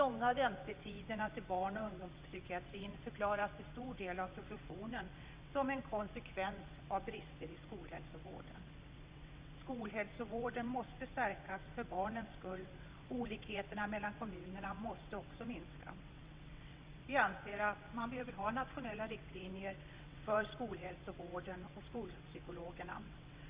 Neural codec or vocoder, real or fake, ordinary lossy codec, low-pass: vocoder, 22.05 kHz, 80 mel bands, WaveNeXt; fake; Opus, 64 kbps; 3.6 kHz